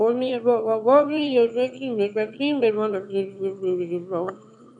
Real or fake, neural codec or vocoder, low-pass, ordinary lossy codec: fake; autoencoder, 22.05 kHz, a latent of 192 numbers a frame, VITS, trained on one speaker; 9.9 kHz; none